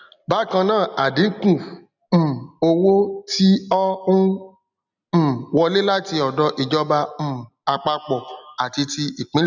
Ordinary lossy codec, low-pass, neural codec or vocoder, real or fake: none; 7.2 kHz; none; real